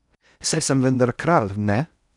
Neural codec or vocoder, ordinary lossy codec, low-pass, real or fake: codec, 16 kHz in and 24 kHz out, 0.8 kbps, FocalCodec, streaming, 65536 codes; none; 10.8 kHz; fake